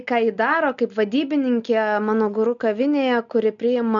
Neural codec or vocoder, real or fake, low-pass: none; real; 7.2 kHz